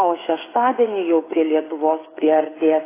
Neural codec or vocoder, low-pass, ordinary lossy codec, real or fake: codec, 16 kHz, 8 kbps, FreqCodec, smaller model; 3.6 kHz; AAC, 16 kbps; fake